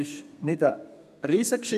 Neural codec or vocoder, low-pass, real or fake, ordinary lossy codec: codec, 32 kHz, 1.9 kbps, SNAC; 14.4 kHz; fake; none